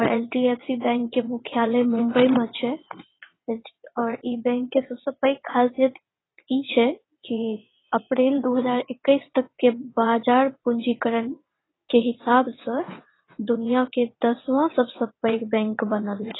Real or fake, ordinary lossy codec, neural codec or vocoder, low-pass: fake; AAC, 16 kbps; vocoder, 22.05 kHz, 80 mel bands, WaveNeXt; 7.2 kHz